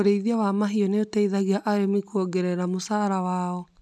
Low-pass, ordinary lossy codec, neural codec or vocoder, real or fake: none; none; none; real